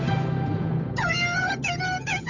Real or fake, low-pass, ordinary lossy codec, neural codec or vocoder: fake; 7.2 kHz; none; codec, 16 kHz, 8 kbps, FunCodec, trained on Chinese and English, 25 frames a second